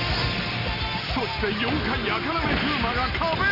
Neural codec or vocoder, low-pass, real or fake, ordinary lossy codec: none; 5.4 kHz; real; AAC, 48 kbps